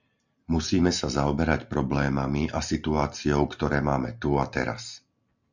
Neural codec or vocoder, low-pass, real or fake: none; 7.2 kHz; real